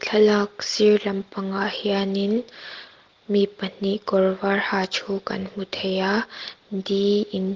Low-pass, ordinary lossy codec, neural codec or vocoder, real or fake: 7.2 kHz; Opus, 16 kbps; none; real